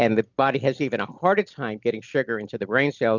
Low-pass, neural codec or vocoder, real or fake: 7.2 kHz; none; real